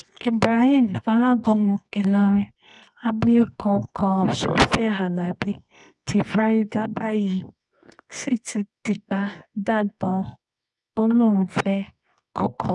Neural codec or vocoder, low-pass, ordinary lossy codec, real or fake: codec, 24 kHz, 0.9 kbps, WavTokenizer, medium music audio release; 10.8 kHz; none; fake